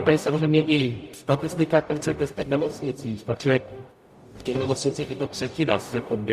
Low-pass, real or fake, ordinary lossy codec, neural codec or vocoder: 14.4 kHz; fake; Opus, 64 kbps; codec, 44.1 kHz, 0.9 kbps, DAC